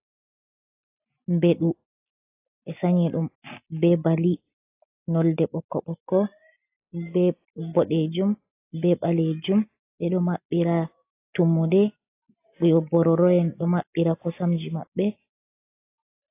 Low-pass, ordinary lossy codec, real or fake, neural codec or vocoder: 3.6 kHz; AAC, 24 kbps; real; none